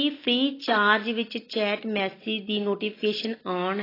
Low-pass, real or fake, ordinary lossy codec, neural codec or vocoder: 5.4 kHz; real; AAC, 24 kbps; none